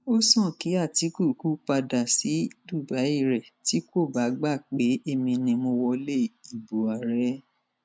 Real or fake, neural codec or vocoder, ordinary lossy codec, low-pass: real; none; none; none